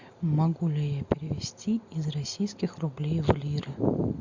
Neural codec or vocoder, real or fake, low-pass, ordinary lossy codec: none; real; 7.2 kHz; MP3, 64 kbps